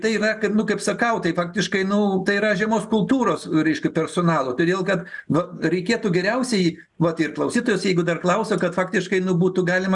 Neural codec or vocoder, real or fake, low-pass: none; real; 10.8 kHz